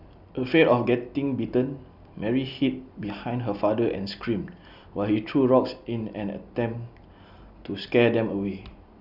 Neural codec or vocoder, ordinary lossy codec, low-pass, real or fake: none; Opus, 64 kbps; 5.4 kHz; real